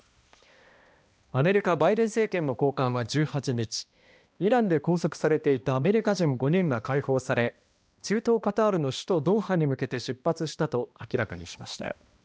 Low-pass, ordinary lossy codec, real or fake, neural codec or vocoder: none; none; fake; codec, 16 kHz, 1 kbps, X-Codec, HuBERT features, trained on balanced general audio